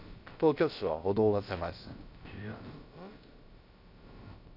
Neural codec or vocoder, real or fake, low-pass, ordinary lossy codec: codec, 16 kHz, about 1 kbps, DyCAST, with the encoder's durations; fake; 5.4 kHz; none